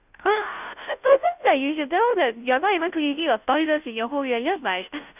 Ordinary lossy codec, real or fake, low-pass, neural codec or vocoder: none; fake; 3.6 kHz; codec, 16 kHz, 0.5 kbps, FunCodec, trained on Chinese and English, 25 frames a second